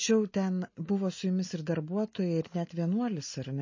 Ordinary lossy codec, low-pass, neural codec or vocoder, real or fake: MP3, 32 kbps; 7.2 kHz; none; real